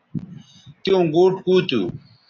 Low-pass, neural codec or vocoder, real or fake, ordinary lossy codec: 7.2 kHz; none; real; AAC, 32 kbps